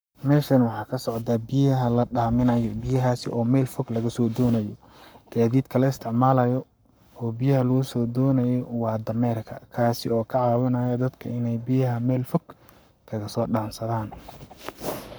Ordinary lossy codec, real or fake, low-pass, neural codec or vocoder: none; fake; none; codec, 44.1 kHz, 7.8 kbps, Pupu-Codec